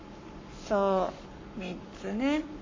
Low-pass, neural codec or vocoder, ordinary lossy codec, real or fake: 7.2 kHz; codec, 44.1 kHz, 7.8 kbps, Pupu-Codec; MP3, 32 kbps; fake